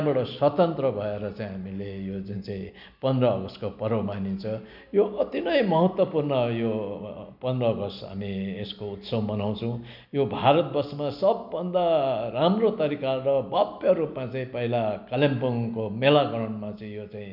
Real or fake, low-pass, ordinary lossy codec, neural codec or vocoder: real; 5.4 kHz; none; none